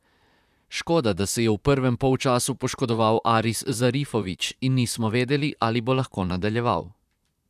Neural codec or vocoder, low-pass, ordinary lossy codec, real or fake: vocoder, 44.1 kHz, 128 mel bands, Pupu-Vocoder; 14.4 kHz; none; fake